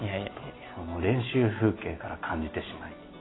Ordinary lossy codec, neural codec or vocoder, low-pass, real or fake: AAC, 16 kbps; none; 7.2 kHz; real